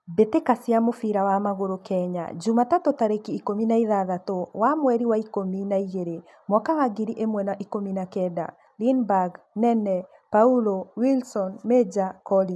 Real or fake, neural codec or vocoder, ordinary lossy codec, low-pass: real; none; none; none